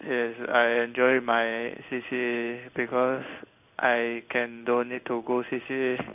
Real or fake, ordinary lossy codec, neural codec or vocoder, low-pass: fake; none; codec, 16 kHz in and 24 kHz out, 1 kbps, XY-Tokenizer; 3.6 kHz